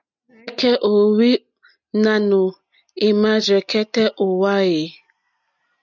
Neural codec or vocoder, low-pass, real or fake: none; 7.2 kHz; real